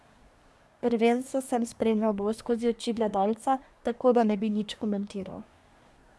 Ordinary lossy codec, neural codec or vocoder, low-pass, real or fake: none; codec, 24 kHz, 1 kbps, SNAC; none; fake